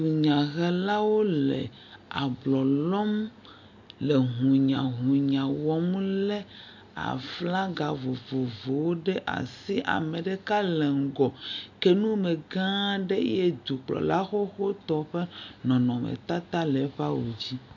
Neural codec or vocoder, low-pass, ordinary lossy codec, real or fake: none; 7.2 kHz; MP3, 64 kbps; real